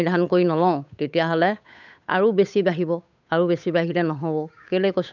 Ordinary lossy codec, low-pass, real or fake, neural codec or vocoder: none; 7.2 kHz; fake; codec, 16 kHz, 8 kbps, FunCodec, trained on Chinese and English, 25 frames a second